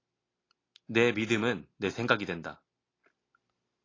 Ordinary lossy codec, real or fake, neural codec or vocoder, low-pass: AAC, 32 kbps; real; none; 7.2 kHz